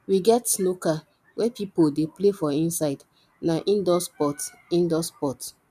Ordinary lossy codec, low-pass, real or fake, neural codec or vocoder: none; 14.4 kHz; real; none